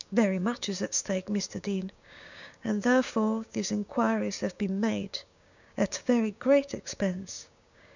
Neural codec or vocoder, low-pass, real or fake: codec, 16 kHz, 6 kbps, DAC; 7.2 kHz; fake